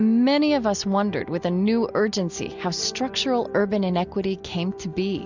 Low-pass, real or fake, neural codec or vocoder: 7.2 kHz; real; none